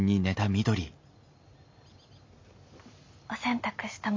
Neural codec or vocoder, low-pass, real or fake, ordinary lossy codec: none; 7.2 kHz; real; MP3, 48 kbps